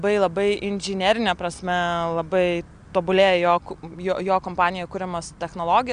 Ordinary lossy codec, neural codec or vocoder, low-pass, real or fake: Opus, 64 kbps; none; 9.9 kHz; real